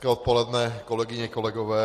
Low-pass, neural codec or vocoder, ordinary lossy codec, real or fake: 14.4 kHz; none; AAC, 48 kbps; real